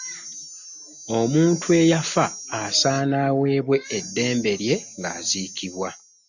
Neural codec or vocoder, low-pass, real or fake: none; 7.2 kHz; real